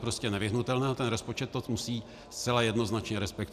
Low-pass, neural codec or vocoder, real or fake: 14.4 kHz; none; real